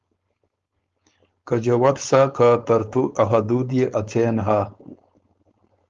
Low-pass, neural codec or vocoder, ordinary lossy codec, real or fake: 7.2 kHz; codec, 16 kHz, 4.8 kbps, FACodec; Opus, 32 kbps; fake